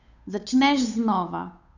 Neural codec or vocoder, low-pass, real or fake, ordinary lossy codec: codec, 16 kHz, 8 kbps, FunCodec, trained on Chinese and English, 25 frames a second; 7.2 kHz; fake; none